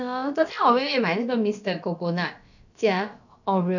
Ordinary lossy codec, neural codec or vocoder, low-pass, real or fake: none; codec, 16 kHz, about 1 kbps, DyCAST, with the encoder's durations; 7.2 kHz; fake